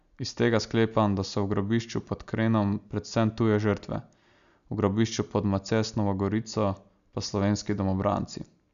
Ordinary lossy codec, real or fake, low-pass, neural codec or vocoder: none; real; 7.2 kHz; none